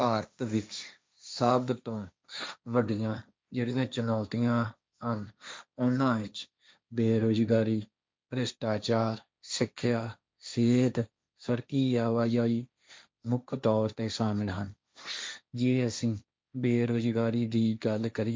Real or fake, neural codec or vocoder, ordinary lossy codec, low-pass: fake; codec, 16 kHz, 1.1 kbps, Voila-Tokenizer; none; none